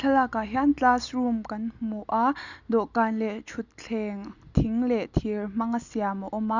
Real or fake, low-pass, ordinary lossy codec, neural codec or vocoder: real; 7.2 kHz; none; none